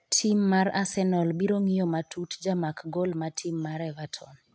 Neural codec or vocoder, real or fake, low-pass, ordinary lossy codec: none; real; none; none